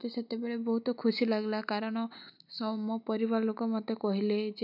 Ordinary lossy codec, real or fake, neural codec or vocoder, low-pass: none; real; none; 5.4 kHz